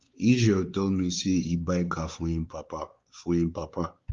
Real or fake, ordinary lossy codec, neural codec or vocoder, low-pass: fake; Opus, 32 kbps; codec, 16 kHz, 4 kbps, X-Codec, WavLM features, trained on Multilingual LibriSpeech; 7.2 kHz